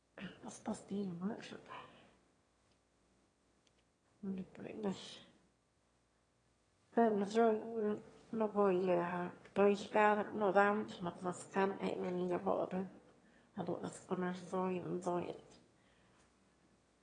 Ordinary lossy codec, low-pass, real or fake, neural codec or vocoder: AAC, 32 kbps; 9.9 kHz; fake; autoencoder, 22.05 kHz, a latent of 192 numbers a frame, VITS, trained on one speaker